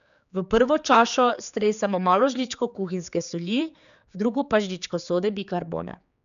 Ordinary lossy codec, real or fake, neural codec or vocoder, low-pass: none; fake; codec, 16 kHz, 4 kbps, X-Codec, HuBERT features, trained on general audio; 7.2 kHz